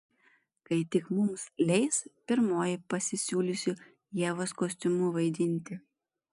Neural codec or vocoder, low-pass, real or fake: none; 10.8 kHz; real